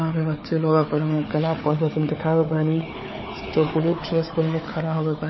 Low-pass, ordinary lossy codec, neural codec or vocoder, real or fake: 7.2 kHz; MP3, 24 kbps; codec, 16 kHz, 4 kbps, FunCodec, trained on Chinese and English, 50 frames a second; fake